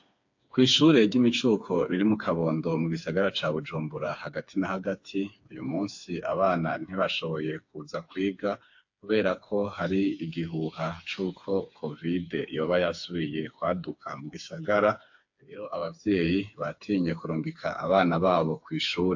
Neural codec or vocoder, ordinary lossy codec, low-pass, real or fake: codec, 16 kHz, 4 kbps, FreqCodec, smaller model; AAC, 48 kbps; 7.2 kHz; fake